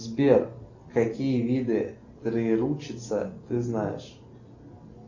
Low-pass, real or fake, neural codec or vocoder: 7.2 kHz; real; none